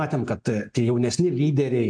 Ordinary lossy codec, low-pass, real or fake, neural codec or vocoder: MP3, 64 kbps; 9.9 kHz; real; none